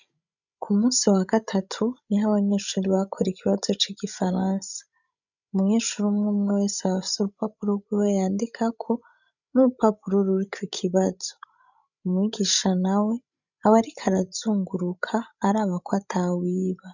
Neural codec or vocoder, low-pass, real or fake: codec, 16 kHz, 16 kbps, FreqCodec, larger model; 7.2 kHz; fake